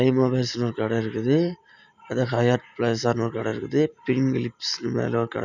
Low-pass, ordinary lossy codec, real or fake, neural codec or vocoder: 7.2 kHz; none; real; none